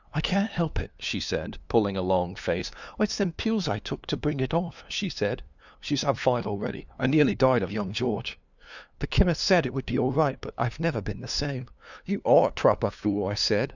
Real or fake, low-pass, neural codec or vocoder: fake; 7.2 kHz; codec, 16 kHz, 2 kbps, FunCodec, trained on LibriTTS, 25 frames a second